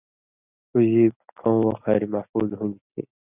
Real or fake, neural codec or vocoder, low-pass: real; none; 3.6 kHz